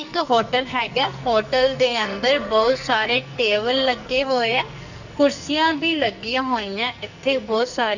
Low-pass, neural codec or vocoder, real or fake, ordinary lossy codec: 7.2 kHz; codec, 44.1 kHz, 2.6 kbps, SNAC; fake; none